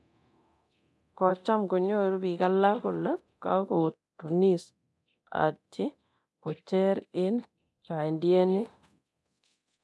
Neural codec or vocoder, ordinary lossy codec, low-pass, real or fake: codec, 24 kHz, 0.9 kbps, DualCodec; none; none; fake